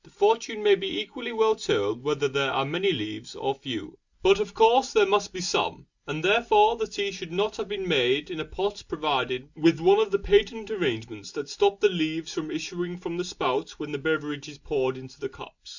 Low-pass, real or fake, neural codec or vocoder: 7.2 kHz; real; none